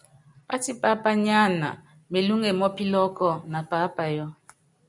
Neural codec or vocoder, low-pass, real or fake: none; 10.8 kHz; real